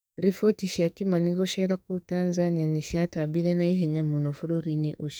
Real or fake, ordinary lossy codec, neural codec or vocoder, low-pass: fake; none; codec, 44.1 kHz, 2.6 kbps, SNAC; none